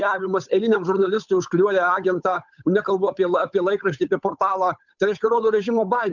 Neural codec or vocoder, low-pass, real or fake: codec, 16 kHz, 8 kbps, FunCodec, trained on Chinese and English, 25 frames a second; 7.2 kHz; fake